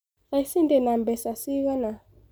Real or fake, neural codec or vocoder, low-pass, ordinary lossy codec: real; none; none; none